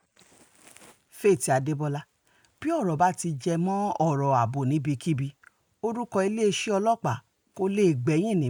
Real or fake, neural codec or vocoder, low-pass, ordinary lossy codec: real; none; none; none